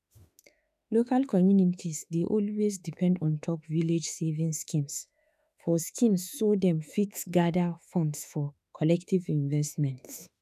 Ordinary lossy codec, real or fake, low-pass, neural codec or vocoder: none; fake; 14.4 kHz; autoencoder, 48 kHz, 32 numbers a frame, DAC-VAE, trained on Japanese speech